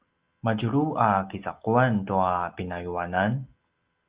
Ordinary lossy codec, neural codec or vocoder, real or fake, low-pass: Opus, 32 kbps; none; real; 3.6 kHz